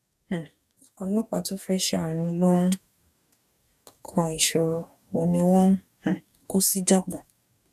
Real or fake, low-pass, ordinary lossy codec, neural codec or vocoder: fake; 14.4 kHz; none; codec, 44.1 kHz, 2.6 kbps, DAC